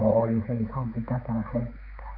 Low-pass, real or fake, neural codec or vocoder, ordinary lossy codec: 5.4 kHz; fake; codec, 16 kHz, 4 kbps, X-Codec, HuBERT features, trained on balanced general audio; none